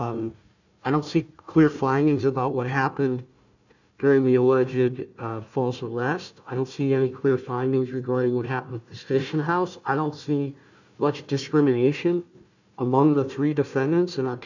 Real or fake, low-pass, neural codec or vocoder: fake; 7.2 kHz; codec, 16 kHz, 1 kbps, FunCodec, trained on Chinese and English, 50 frames a second